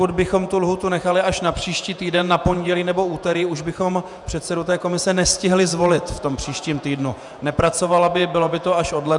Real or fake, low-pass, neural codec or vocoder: fake; 10.8 kHz; vocoder, 48 kHz, 128 mel bands, Vocos